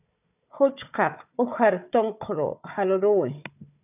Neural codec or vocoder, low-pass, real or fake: codec, 16 kHz, 4 kbps, FunCodec, trained on Chinese and English, 50 frames a second; 3.6 kHz; fake